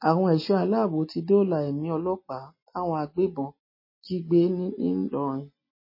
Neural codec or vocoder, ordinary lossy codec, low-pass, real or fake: none; MP3, 24 kbps; 5.4 kHz; real